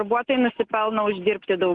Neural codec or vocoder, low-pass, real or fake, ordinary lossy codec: none; 9.9 kHz; real; Opus, 24 kbps